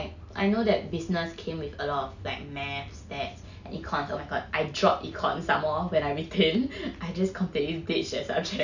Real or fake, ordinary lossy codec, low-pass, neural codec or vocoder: real; none; 7.2 kHz; none